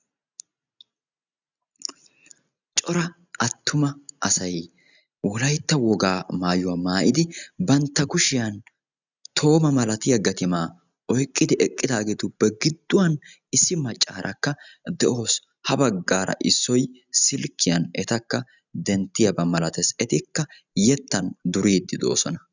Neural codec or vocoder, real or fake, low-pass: none; real; 7.2 kHz